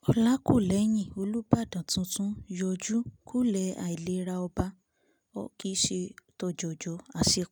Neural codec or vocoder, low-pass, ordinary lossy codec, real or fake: none; none; none; real